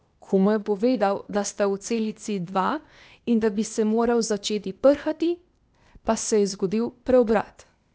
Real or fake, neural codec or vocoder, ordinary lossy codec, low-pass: fake; codec, 16 kHz, 0.8 kbps, ZipCodec; none; none